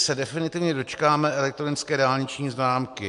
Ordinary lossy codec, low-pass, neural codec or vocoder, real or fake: MP3, 64 kbps; 10.8 kHz; none; real